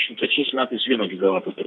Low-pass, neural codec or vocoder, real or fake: 10.8 kHz; codec, 32 kHz, 1.9 kbps, SNAC; fake